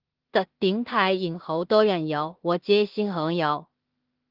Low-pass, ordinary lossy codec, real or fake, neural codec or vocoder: 5.4 kHz; Opus, 16 kbps; fake; codec, 16 kHz in and 24 kHz out, 0.4 kbps, LongCat-Audio-Codec, two codebook decoder